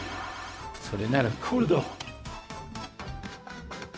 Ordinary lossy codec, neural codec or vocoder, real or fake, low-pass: none; codec, 16 kHz, 0.4 kbps, LongCat-Audio-Codec; fake; none